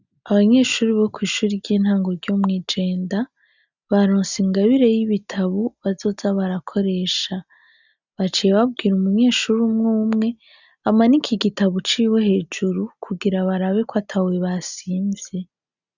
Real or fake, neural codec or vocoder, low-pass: real; none; 7.2 kHz